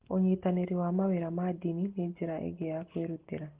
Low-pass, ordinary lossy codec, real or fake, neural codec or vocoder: 3.6 kHz; Opus, 16 kbps; real; none